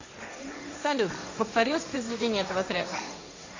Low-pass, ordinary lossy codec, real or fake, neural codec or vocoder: 7.2 kHz; AAC, 48 kbps; fake; codec, 16 kHz, 1.1 kbps, Voila-Tokenizer